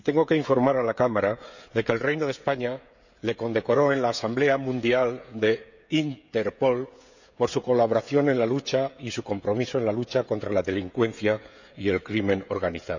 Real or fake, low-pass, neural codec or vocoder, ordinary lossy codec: fake; 7.2 kHz; codec, 16 kHz, 16 kbps, FreqCodec, smaller model; none